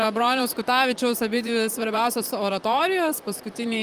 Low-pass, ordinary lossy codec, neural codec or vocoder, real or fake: 14.4 kHz; Opus, 32 kbps; vocoder, 44.1 kHz, 128 mel bands, Pupu-Vocoder; fake